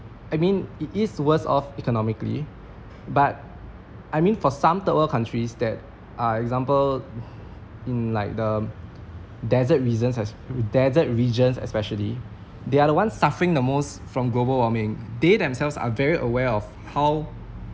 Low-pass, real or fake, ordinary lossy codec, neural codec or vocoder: none; real; none; none